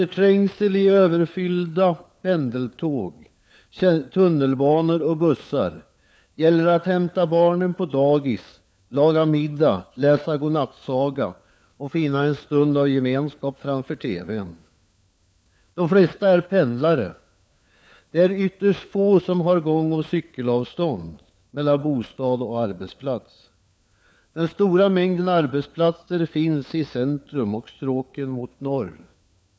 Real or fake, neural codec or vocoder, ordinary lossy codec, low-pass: fake; codec, 16 kHz, 4 kbps, FunCodec, trained on LibriTTS, 50 frames a second; none; none